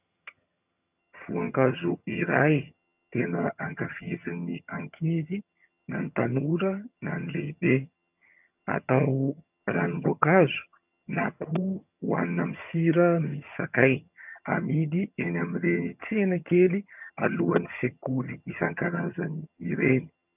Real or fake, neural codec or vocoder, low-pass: fake; vocoder, 22.05 kHz, 80 mel bands, HiFi-GAN; 3.6 kHz